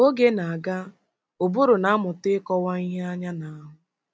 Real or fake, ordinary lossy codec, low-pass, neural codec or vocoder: real; none; none; none